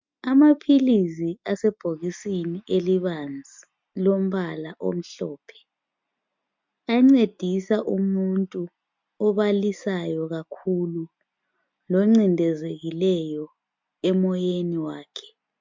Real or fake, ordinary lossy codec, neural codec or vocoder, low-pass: real; MP3, 64 kbps; none; 7.2 kHz